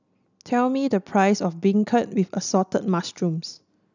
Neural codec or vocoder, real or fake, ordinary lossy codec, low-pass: none; real; none; 7.2 kHz